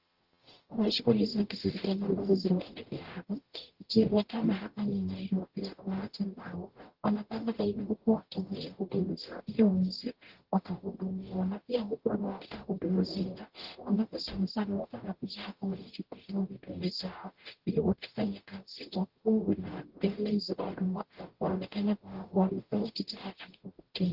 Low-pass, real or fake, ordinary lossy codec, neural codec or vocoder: 5.4 kHz; fake; Opus, 16 kbps; codec, 44.1 kHz, 0.9 kbps, DAC